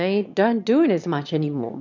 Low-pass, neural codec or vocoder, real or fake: 7.2 kHz; autoencoder, 22.05 kHz, a latent of 192 numbers a frame, VITS, trained on one speaker; fake